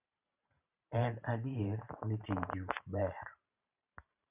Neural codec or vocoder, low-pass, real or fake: vocoder, 44.1 kHz, 128 mel bands every 512 samples, BigVGAN v2; 3.6 kHz; fake